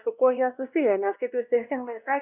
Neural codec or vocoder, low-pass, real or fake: codec, 16 kHz, 1 kbps, X-Codec, WavLM features, trained on Multilingual LibriSpeech; 3.6 kHz; fake